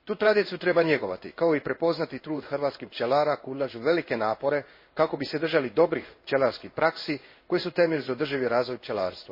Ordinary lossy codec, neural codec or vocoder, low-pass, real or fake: MP3, 24 kbps; codec, 16 kHz in and 24 kHz out, 1 kbps, XY-Tokenizer; 5.4 kHz; fake